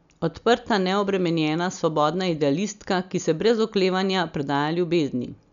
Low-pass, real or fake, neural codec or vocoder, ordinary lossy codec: 7.2 kHz; real; none; none